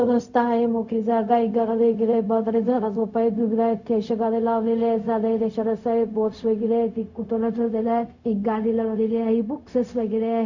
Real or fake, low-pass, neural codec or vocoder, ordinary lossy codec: fake; 7.2 kHz; codec, 16 kHz, 0.4 kbps, LongCat-Audio-Codec; none